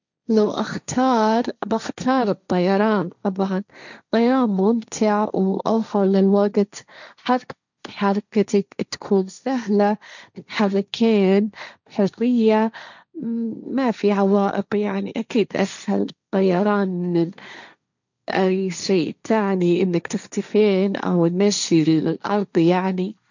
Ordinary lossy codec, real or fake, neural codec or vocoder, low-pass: none; fake; codec, 16 kHz, 1.1 kbps, Voila-Tokenizer; 7.2 kHz